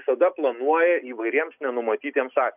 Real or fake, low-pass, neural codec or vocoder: real; 3.6 kHz; none